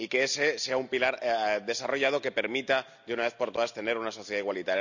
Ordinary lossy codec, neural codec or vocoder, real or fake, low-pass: none; none; real; 7.2 kHz